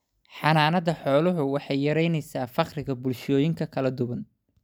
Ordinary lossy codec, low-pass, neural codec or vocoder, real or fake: none; none; none; real